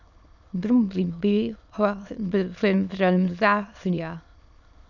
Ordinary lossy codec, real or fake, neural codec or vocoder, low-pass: none; fake; autoencoder, 22.05 kHz, a latent of 192 numbers a frame, VITS, trained on many speakers; 7.2 kHz